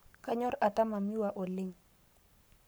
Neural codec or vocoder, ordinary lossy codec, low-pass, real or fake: codec, 44.1 kHz, 7.8 kbps, Pupu-Codec; none; none; fake